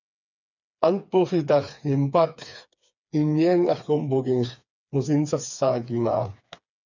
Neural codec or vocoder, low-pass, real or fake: codec, 16 kHz, 4 kbps, FreqCodec, smaller model; 7.2 kHz; fake